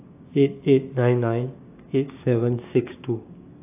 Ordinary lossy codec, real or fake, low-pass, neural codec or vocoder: AAC, 24 kbps; fake; 3.6 kHz; codec, 16 kHz, 6 kbps, DAC